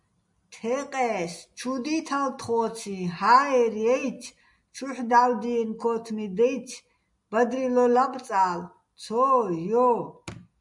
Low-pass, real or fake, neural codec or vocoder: 10.8 kHz; real; none